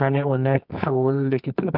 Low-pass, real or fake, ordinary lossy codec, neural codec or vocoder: 5.4 kHz; fake; none; codec, 24 kHz, 0.9 kbps, WavTokenizer, medium music audio release